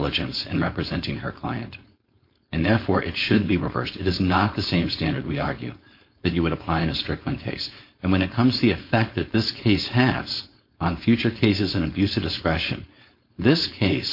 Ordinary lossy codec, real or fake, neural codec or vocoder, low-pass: MP3, 32 kbps; fake; codec, 16 kHz, 4.8 kbps, FACodec; 5.4 kHz